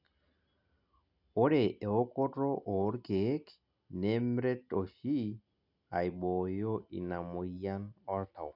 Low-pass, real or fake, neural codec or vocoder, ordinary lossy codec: 5.4 kHz; real; none; none